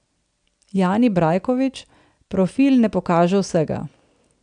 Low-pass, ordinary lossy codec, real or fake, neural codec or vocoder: 9.9 kHz; none; real; none